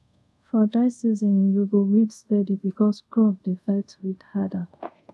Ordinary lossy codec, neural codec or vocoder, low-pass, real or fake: none; codec, 24 kHz, 0.5 kbps, DualCodec; none; fake